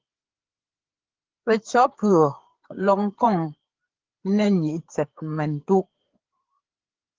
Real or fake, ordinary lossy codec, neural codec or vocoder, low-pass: fake; Opus, 16 kbps; codec, 16 kHz, 4 kbps, FreqCodec, larger model; 7.2 kHz